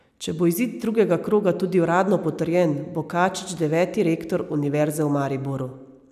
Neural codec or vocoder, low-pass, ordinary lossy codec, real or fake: none; 14.4 kHz; none; real